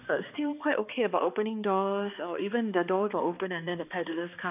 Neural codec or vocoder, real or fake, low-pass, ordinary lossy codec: codec, 16 kHz, 2 kbps, X-Codec, HuBERT features, trained on balanced general audio; fake; 3.6 kHz; none